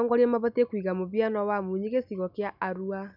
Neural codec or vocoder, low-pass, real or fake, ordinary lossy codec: none; 5.4 kHz; real; none